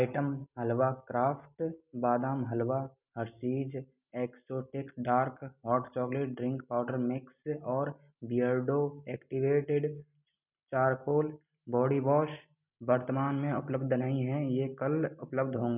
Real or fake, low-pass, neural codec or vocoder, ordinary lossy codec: real; 3.6 kHz; none; none